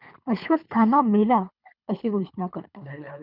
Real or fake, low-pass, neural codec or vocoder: fake; 5.4 kHz; codec, 24 kHz, 3 kbps, HILCodec